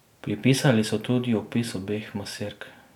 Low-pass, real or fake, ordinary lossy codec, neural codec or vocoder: 19.8 kHz; real; none; none